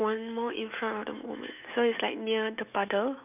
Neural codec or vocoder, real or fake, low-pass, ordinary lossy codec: autoencoder, 48 kHz, 128 numbers a frame, DAC-VAE, trained on Japanese speech; fake; 3.6 kHz; none